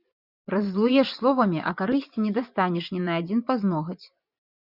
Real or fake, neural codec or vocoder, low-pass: fake; vocoder, 24 kHz, 100 mel bands, Vocos; 5.4 kHz